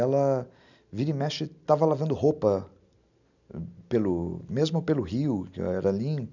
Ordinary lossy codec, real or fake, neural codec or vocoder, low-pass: none; real; none; 7.2 kHz